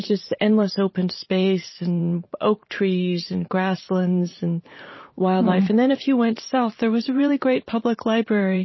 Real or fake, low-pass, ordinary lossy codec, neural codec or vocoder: fake; 7.2 kHz; MP3, 24 kbps; vocoder, 44.1 kHz, 128 mel bands every 512 samples, BigVGAN v2